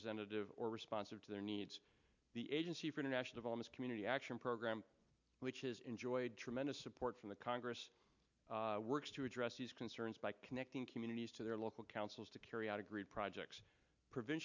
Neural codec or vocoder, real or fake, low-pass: none; real; 7.2 kHz